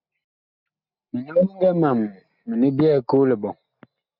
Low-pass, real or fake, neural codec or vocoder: 5.4 kHz; real; none